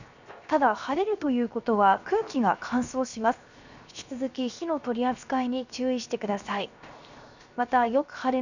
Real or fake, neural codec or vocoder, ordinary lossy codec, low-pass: fake; codec, 16 kHz, 0.7 kbps, FocalCodec; none; 7.2 kHz